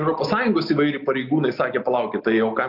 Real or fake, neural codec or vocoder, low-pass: real; none; 5.4 kHz